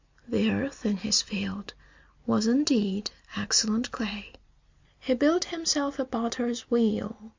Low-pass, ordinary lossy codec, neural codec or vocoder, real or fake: 7.2 kHz; MP3, 64 kbps; none; real